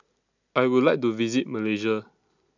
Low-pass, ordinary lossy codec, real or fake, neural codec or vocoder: 7.2 kHz; none; real; none